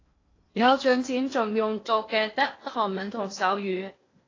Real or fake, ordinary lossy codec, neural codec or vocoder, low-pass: fake; AAC, 32 kbps; codec, 16 kHz in and 24 kHz out, 0.8 kbps, FocalCodec, streaming, 65536 codes; 7.2 kHz